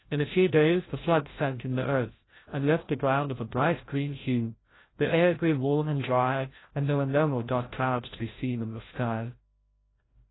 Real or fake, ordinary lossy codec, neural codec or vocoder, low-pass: fake; AAC, 16 kbps; codec, 16 kHz, 0.5 kbps, FreqCodec, larger model; 7.2 kHz